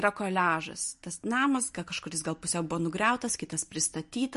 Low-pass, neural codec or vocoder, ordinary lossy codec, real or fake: 14.4 kHz; none; MP3, 48 kbps; real